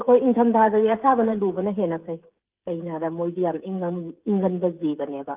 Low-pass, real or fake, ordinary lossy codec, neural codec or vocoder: 3.6 kHz; fake; Opus, 32 kbps; codec, 16 kHz, 16 kbps, FreqCodec, smaller model